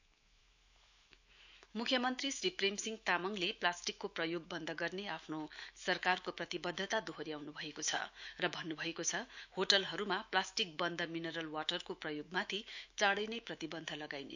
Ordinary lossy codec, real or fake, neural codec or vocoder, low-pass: none; fake; autoencoder, 48 kHz, 128 numbers a frame, DAC-VAE, trained on Japanese speech; 7.2 kHz